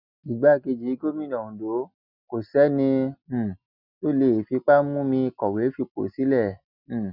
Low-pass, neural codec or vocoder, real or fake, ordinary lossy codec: 5.4 kHz; none; real; none